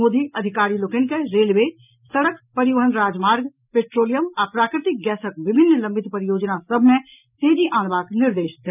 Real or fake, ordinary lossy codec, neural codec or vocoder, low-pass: real; none; none; 3.6 kHz